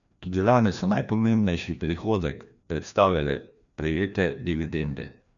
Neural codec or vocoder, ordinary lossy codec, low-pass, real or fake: codec, 16 kHz, 1 kbps, FreqCodec, larger model; none; 7.2 kHz; fake